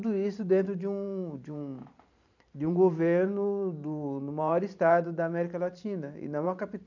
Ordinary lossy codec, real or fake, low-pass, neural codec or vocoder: none; fake; 7.2 kHz; vocoder, 44.1 kHz, 128 mel bands every 512 samples, BigVGAN v2